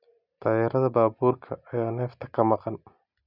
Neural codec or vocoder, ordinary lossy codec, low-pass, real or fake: none; none; 5.4 kHz; real